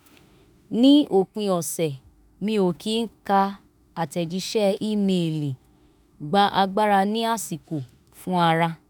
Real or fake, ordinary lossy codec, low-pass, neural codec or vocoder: fake; none; none; autoencoder, 48 kHz, 32 numbers a frame, DAC-VAE, trained on Japanese speech